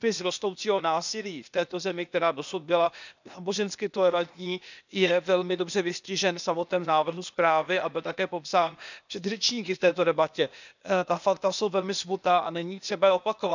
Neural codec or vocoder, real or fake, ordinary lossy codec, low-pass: codec, 16 kHz, 0.8 kbps, ZipCodec; fake; none; 7.2 kHz